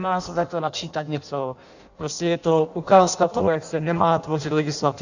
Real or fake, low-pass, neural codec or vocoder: fake; 7.2 kHz; codec, 16 kHz in and 24 kHz out, 0.6 kbps, FireRedTTS-2 codec